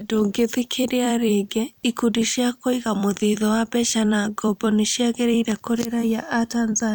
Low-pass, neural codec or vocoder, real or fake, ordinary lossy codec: none; vocoder, 44.1 kHz, 128 mel bands every 256 samples, BigVGAN v2; fake; none